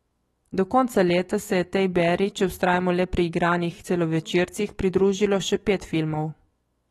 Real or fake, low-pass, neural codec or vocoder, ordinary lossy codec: fake; 19.8 kHz; autoencoder, 48 kHz, 128 numbers a frame, DAC-VAE, trained on Japanese speech; AAC, 32 kbps